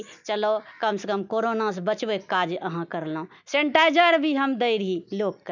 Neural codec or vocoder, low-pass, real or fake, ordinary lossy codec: none; 7.2 kHz; real; none